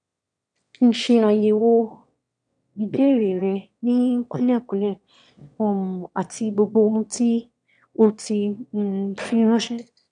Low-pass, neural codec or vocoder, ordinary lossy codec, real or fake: 9.9 kHz; autoencoder, 22.05 kHz, a latent of 192 numbers a frame, VITS, trained on one speaker; none; fake